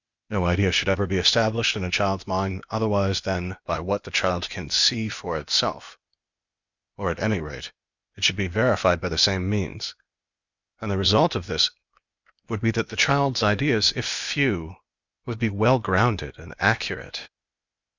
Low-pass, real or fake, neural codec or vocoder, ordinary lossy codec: 7.2 kHz; fake; codec, 16 kHz, 0.8 kbps, ZipCodec; Opus, 64 kbps